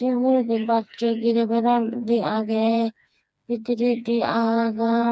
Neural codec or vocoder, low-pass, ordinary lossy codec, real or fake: codec, 16 kHz, 2 kbps, FreqCodec, smaller model; none; none; fake